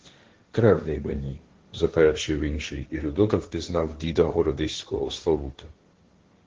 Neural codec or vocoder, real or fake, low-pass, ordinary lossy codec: codec, 16 kHz, 1.1 kbps, Voila-Tokenizer; fake; 7.2 kHz; Opus, 16 kbps